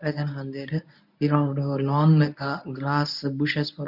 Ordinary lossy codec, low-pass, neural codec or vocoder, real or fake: none; 5.4 kHz; codec, 24 kHz, 0.9 kbps, WavTokenizer, medium speech release version 1; fake